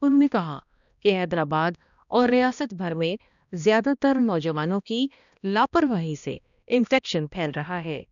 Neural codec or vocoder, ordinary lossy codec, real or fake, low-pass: codec, 16 kHz, 1 kbps, X-Codec, HuBERT features, trained on balanced general audio; none; fake; 7.2 kHz